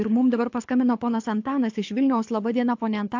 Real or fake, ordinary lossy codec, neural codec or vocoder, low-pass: fake; AAC, 48 kbps; codec, 24 kHz, 6 kbps, HILCodec; 7.2 kHz